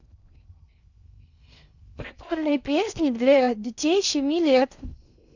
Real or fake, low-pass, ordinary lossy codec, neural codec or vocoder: fake; 7.2 kHz; none; codec, 16 kHz in and 24 kHz out, 0.6 kbps, FocalCodec, streaming, 2048 codes